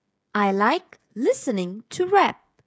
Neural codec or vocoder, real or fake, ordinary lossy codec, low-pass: codec, 16 kHz, 16 kbps, FreqCodec, smaller model; fake; none; none